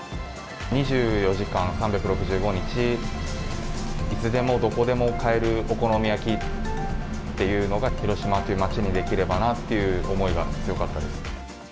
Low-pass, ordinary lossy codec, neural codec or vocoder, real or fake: none; none; none; real